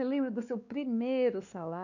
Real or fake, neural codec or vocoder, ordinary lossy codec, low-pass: fake; codec, 16 kHz, 2 kbps, X-Codec, WavLM features, trained on Multilingual LibriSpeech; none; 7.2 kHz